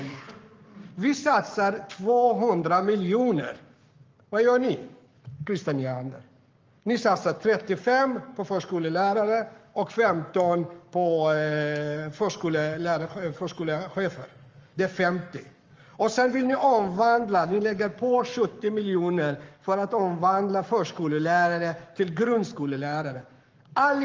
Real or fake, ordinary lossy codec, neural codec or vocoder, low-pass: fake; Opus, 32 kbps; codec, 44.1 kHz, 7.8 kbps, Pupu-Codec; 7.2 kHz